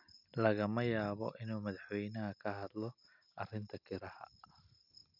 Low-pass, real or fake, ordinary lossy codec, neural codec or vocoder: 5.4 kHz; real; none; none